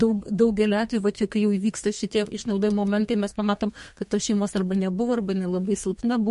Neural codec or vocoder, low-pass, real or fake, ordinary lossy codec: codec, 32 kHz, 1.9 kbps, SNAC; 14.4 kHz; fake; MP3, 48 kbps